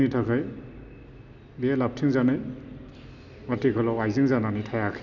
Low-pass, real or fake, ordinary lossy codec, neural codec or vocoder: 7.2 kHz; real; none; none